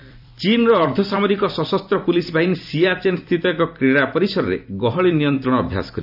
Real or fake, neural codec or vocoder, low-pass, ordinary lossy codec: fake; vocoder, 44.1 kHz, 128 mel bands every 256 samples, BigVGAN v2; 5.4 kHz; AAC, 48 kbps